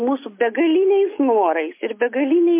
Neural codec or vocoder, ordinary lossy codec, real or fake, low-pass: none; MP3, 24 kbps; real; 3.6 kHz